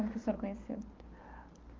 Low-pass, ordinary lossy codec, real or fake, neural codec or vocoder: 7.2 kHz; Opus, 32 kbps; real; none